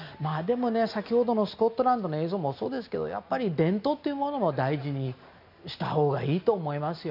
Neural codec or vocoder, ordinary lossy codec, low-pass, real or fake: none; none; 5.4 kHz; real